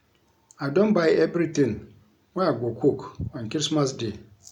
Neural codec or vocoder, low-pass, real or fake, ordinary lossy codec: none; 19.8 kHz; real; none